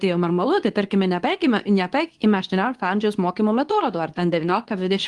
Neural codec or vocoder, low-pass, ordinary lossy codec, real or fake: codec, 24 kHz, 0.9 kbps, WavTokenizer, medium speech release version 2; 10.8 kHz; Opus, 24 kbps; fake